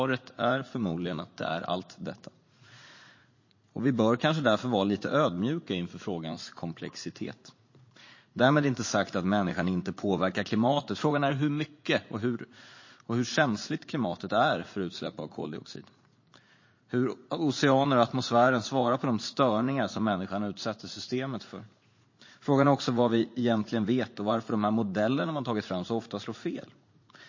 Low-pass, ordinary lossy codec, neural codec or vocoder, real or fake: 7.2 kHz; MP3, 32 kbps; vocoder, 44.1 kHz, 80 mel bands, Vocos; fake